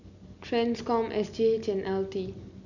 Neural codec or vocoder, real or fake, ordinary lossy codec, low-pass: none; real; none; 7.2 kHz